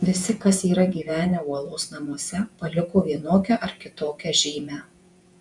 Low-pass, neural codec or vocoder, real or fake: 10.8 kHz; vocoder, 48 kHz, 128 mel bands, Vocos; fake